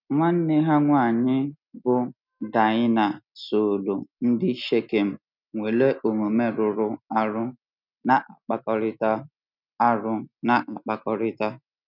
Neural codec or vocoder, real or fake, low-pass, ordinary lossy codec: none; real; 5.4 kHz; none